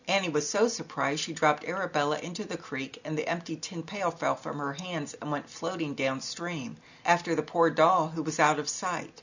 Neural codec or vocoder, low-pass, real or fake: none; 7.2 kHz; real